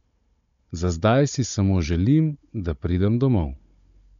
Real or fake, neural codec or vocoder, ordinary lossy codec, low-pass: fake; codec, 16 kHz, 16 kbps, FunCodec, trained on Chinese and English, 50 frames a second; MP3, 64 kbps; 7.2 kHz